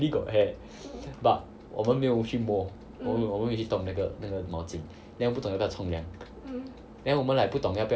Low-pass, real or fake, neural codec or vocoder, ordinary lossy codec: none; real; none; none